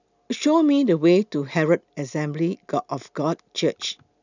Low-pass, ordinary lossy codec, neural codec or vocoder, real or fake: 7.2 kHz; none; none; real